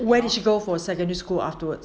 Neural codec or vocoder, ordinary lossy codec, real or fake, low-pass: none; none; real; none